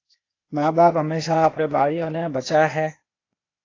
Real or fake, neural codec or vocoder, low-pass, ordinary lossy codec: fake; codec, 16 kHz, 0.8 kbps, ZipCodec; 7.2 kHz; AAC, 32 kbps